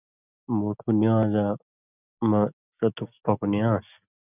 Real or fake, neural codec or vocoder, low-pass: real; none; 3.6 kHz